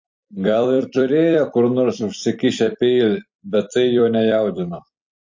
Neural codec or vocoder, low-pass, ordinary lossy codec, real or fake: vocoder, 44.1 kHz, 128 mel bands every 256 samples, BigVGAN v2; 7.2 kHz; MP3, 48 kbps; fake